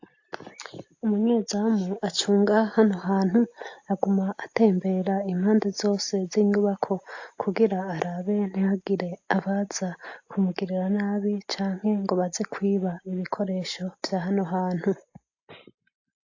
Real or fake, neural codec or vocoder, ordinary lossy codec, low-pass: real; none; AAC, 48 kbps; 7.2 kHz